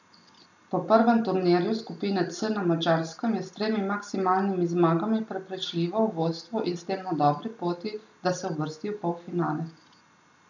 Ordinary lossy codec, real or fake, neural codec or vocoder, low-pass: none; real; none; 7.2 kHz